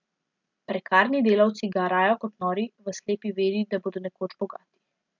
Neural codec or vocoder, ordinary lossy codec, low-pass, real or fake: none; MP3, 64 kbps; 7.2 kHz; real